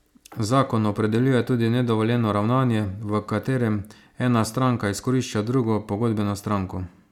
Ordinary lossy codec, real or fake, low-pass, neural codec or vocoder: none; real; 19.8 kHz; none